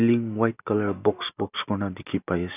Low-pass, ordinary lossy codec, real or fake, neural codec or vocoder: 3.6 kHz; none; real; none